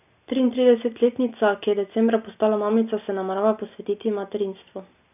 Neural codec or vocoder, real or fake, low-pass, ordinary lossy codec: none; real; 3.6 kHz; none